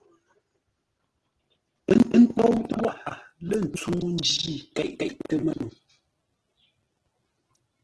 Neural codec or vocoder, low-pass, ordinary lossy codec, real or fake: none; 10.8 kHz; Opus, 16 kbps; real